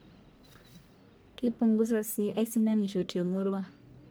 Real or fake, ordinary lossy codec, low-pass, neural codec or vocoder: fake; none; none; codec, 44.1 kHz, 1.7 kbps, Pupu-Codec